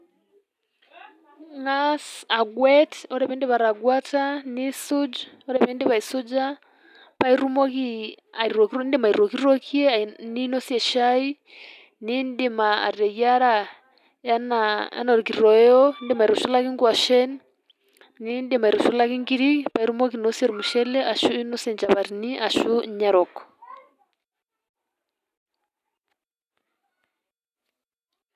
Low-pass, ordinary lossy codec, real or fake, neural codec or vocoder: 14.4 kHz; none; real; none